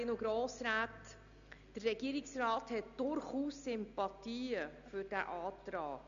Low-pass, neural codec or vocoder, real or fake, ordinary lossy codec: 7.2 kHz; none; real; MP3, 96 kbps